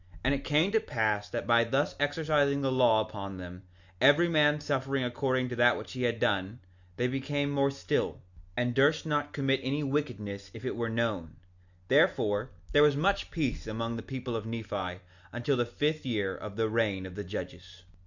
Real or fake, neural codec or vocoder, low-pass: real; none; 7.2 kHz